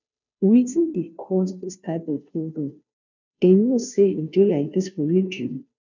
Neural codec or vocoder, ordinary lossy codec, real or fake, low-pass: codec, 16 kHz, 0.5 kbps, FunCodec, trained on Chinese and English, 25 frames a second; none; fake; 7.2 kHz